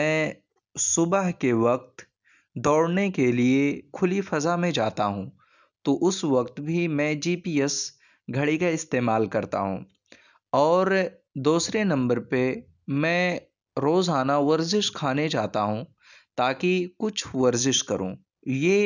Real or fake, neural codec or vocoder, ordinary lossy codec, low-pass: real; none; none; 7.2 kHz